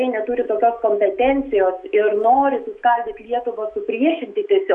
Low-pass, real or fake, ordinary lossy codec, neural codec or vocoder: 10.8 kHz; fake; MP3, 96 kbps; autoencoder, 48 kHz, 128 numbers a frame, DAC-VAE, trained on Japanese speech